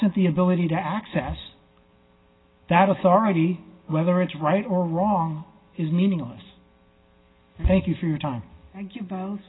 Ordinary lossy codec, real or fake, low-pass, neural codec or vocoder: AAC, 16 kbps; real; 7.2 kHz; none